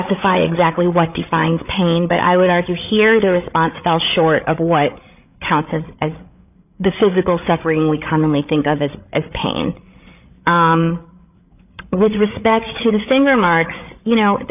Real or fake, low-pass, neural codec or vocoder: fake; 3.6 kHz; codec, 16 kHz, 8 kbps, FreqCodec, larger model